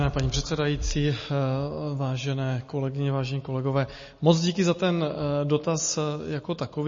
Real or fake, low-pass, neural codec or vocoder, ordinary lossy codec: real; 7.2 kHz; none; MP3, 32 kbps